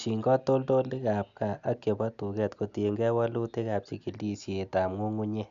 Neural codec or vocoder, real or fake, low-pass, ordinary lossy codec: none; real; 7.2 kHz; none